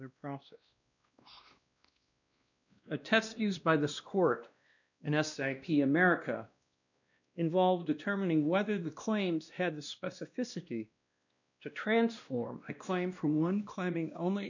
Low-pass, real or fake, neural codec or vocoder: 7.2 kHz; fake; codec, 16 kHz, 1 kbps, X-Codec, WavLM features, trained on Multilingual LibriSpeech